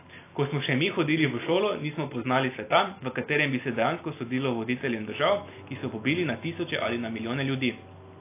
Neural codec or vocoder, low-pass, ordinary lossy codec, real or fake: none; 3.6 kHz; AAC, 24 kbps; real